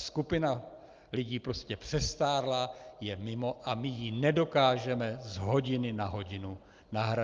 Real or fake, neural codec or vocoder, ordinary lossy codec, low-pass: real; none; Opus, 32 kbps; 7.2 kHz